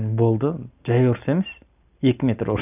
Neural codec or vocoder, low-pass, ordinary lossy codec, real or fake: none; 3.6 kHz; none; real